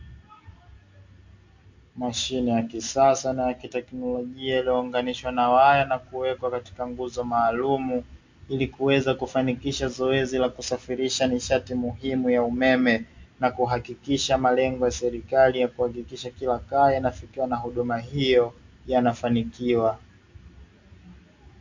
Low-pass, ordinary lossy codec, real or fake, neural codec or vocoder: 7.2 kHz; MP3, 48 kbps; real; none